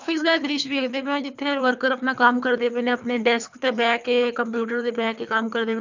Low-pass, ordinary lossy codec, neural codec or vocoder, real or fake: 7.2 kHz; none; codec, 24 kHz, 3 kbps, HILCodec; fake